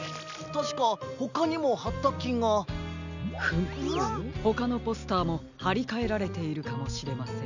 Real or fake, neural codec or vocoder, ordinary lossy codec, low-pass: real; none; none; 7.2 kHz